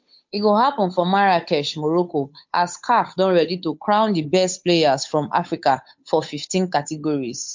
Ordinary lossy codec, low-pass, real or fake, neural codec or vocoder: MP3, 48 kbps; 7.2 kHz; fake; codec, 16 kHz, 8 kbps, FunCodec, trained on Chinese and English, 25 frames a second